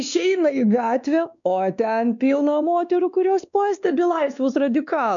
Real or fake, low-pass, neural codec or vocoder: fake; 7.2 kHz; codec, 16 kHz, 2 kbps, X-Codec, WavLM features, trained on Multilingual LibriSpeech